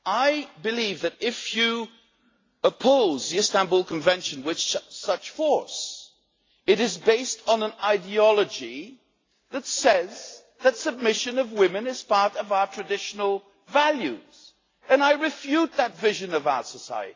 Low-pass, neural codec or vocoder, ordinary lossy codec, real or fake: 7.2 kHz; none; AAC, 32 kbps; real